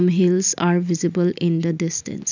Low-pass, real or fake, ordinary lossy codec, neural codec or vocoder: 7.2 kHz; real; none; none